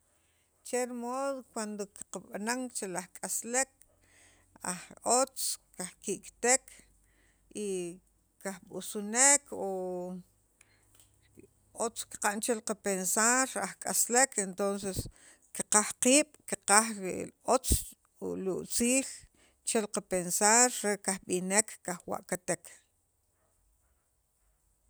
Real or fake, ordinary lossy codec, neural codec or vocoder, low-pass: real; none; none; none